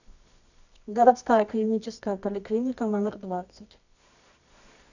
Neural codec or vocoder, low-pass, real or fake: codec, 24 kHz, 0.9 kbps, WavTokenizer, medium music audio release; 7.2 kHz; fake